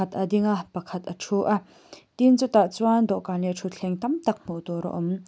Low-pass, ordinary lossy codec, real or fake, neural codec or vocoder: none; none; real; none